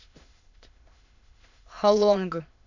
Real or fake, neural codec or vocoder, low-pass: fake; autoencoder, 22.05 kHz, a latent of 192 numbers a frame, VITS, trained on many speakers; 7.2 kHz